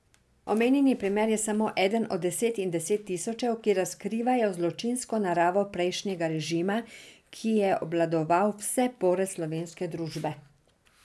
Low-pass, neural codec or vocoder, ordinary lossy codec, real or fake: none; none; none; real